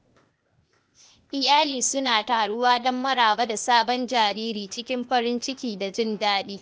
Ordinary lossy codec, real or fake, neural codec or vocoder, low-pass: none; fake; codec, 16 kHz, 0.8 kbps, ZipCodec; none